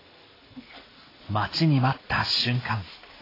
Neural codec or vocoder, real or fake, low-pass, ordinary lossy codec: none; real; 5.4 kHz; AAC, 24 kbps